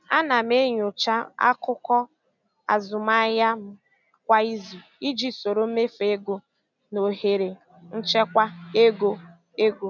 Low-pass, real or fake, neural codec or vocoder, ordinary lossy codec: 7.2 kHz; real; none; none